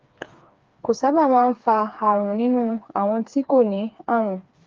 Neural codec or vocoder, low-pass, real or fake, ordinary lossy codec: codec, 16 kHz, 4 kbps, FreqCodec, smaller model; 7.2 kHz; fake; Opus, 32 kbps